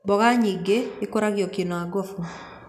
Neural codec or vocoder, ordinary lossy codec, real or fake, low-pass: none; none; real; 14.4 kHz